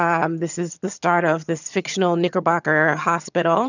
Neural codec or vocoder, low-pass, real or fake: vocoder, 22.05 kHz, 80 mel bands, HiFi-GAN; 7.2 kHz; fake